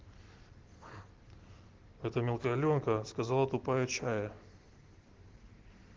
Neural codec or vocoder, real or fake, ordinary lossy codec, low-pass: none; real; Opus, 16 kbps; 7.2 kHz